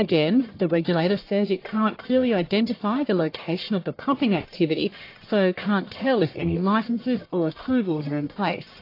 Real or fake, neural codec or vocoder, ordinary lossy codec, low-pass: fake; codec, 44.1 kHz, 1.7 kbps, Pupu-Codec; AAC, 32 kbps; 5.4 kHz